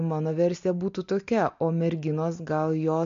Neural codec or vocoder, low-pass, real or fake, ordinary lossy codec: none; 7.2 kHz; real; MP3, 48 kbps